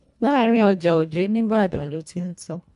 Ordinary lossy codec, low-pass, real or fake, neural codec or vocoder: none; 10.8 kHz; fake; codec, 24 kHz, 1.5 kbps, HILCodec